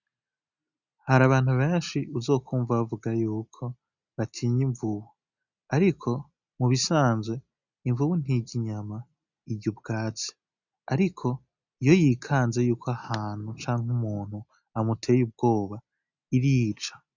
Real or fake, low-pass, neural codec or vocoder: real; 7.2 kHz; none